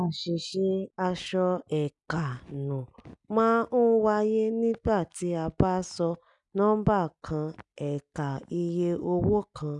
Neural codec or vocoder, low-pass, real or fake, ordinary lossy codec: none; 10.8 kHz; real; none